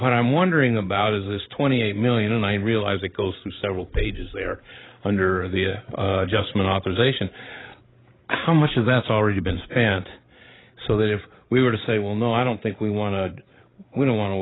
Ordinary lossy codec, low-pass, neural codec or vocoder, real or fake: AAC, 16 kbps; 7.2 kHz; codec, 16 kHz, 16 kbps, FreqCodec, larger model; fake